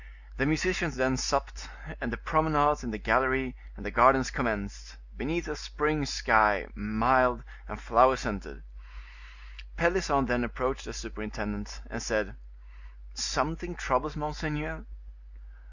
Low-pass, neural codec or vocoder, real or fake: 7.2 kHz; none; real